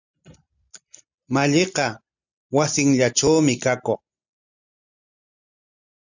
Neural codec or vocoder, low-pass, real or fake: none; 7.2 kHz; real